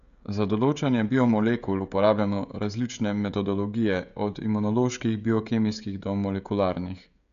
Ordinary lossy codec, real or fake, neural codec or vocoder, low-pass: none; fake; codec, 16 kHz, 16 kbps, FreqCodec, smaller model; 7.2 kHz